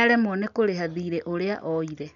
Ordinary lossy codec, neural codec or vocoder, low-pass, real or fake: none; none; 7.2 kHz; real